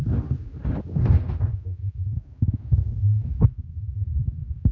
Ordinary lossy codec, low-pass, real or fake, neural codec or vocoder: Opus, 64 kbps; 7.2 kHz; fake; codec, 16 kHz, 2 kbps, X-Codec, HuBERT features, trained on general audio